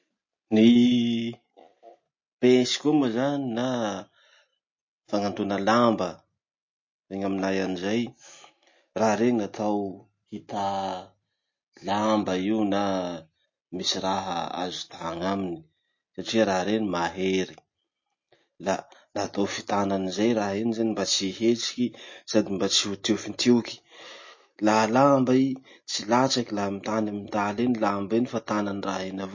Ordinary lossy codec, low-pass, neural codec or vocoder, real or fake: MP3, 32 kbps; 7.2 kHz; none; real